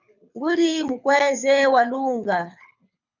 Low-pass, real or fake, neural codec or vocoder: 7.2 kHz; fake; codec, 24 kHz, 6 kbps, HILCodec